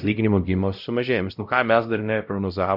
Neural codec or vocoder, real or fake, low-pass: codec, 16 kHz, 0.5 kbps, X-Codec, WavLM features, trained on Multilingual LibriSpeech; fake; 5.4 kHz